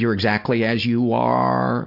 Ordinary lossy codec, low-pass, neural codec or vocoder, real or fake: MP3, 48 kbps; 5.4 kHz; none; real